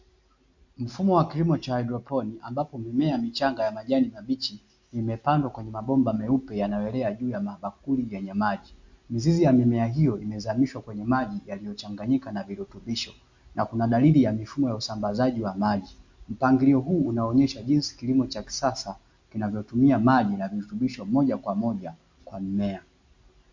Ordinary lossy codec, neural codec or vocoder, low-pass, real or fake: MP3, 48 kbps; none; 7.2 kHz; real